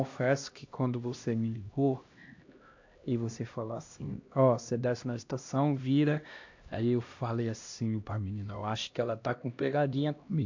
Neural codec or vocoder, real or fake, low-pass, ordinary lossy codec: codec, 16 kHz, 1 kbps, X-Codec, HuBERT features, trained on LibriSpeech; fake; 7.2 kHz; AAC, 48 kbps